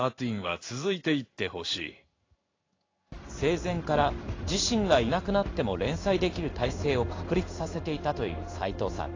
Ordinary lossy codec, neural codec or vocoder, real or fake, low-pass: AAC, 32 kbps; codec, 16 kHz in and 24 kHz out, 1 kbps, XY-Tokenizer; fake; 7.2 kHz